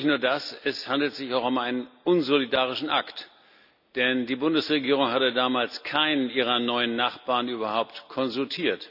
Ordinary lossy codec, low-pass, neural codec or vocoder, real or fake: none; 5.4 kHz; none; real